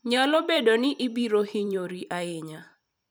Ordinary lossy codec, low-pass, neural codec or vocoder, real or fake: none; none; vocoder, 44.1 kHz, 128 mel bands every 512 samples, BigVGAN v2; fake